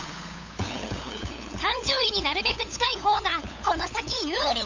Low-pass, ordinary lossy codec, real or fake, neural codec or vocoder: 7.2 kHz; AAC, 48 kbps; fake; codec, 16 kHz, 8 kbps, FunCodec, trained on LibriTTS, 25 frames a second